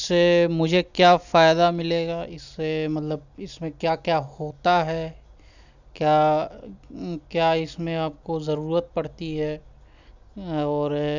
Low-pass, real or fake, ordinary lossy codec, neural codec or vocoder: 7.2 kHz; real; none; none